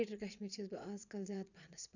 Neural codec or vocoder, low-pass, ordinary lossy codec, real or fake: vocoder, 22.05 kHz, 80 mel bands, Vocos; 7.2 kHz; none; fake